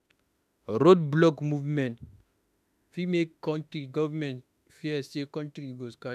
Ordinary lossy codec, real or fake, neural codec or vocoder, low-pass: none; fake; autoencoder, 48 kHz, 32 numbers a frame, DAC-VAE, trained on Japanese speech; 14.4 kHz